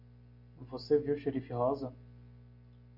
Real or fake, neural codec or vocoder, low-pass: real; none; 5.4 kHz